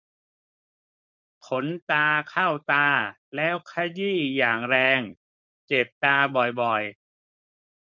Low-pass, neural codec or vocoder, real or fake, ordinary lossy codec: 7.2 kHz; codec, 16 kHz, 4.8 kbps, FACodec; fake; none